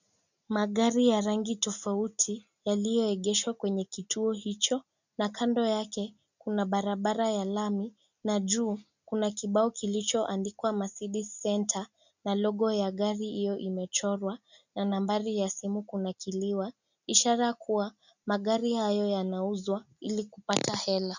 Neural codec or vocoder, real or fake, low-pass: none; real; 7.2 kHz